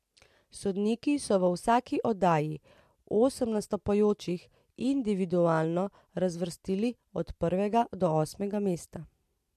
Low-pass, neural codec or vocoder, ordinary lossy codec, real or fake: 14.4 kHz; none; MP3, 64 kbps; real